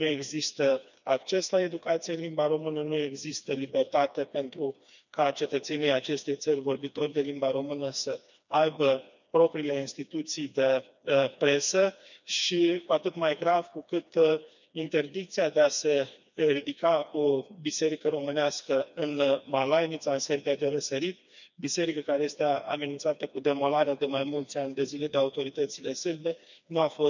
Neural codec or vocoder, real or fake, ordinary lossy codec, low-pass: codec, 16 kHz, 2 kbps, FreqCodec, smaller model; fake; none; 7.2 kHz